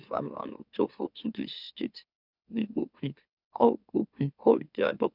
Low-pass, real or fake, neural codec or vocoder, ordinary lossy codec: 5.4 kHz; fake; autoencoder, 44.1 kHz, a latent of 192 numbers a frame, MeloTTS; none